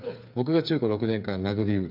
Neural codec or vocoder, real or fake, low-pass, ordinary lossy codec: codec, 16 kHz, 8 kbps, FreqCodec, smaller model; fake; 5.4 kHz; none